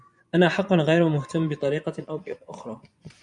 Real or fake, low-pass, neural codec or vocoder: fake; 10.8 kHz; vocoder, 24 kHz, 100 mel bands, Vocos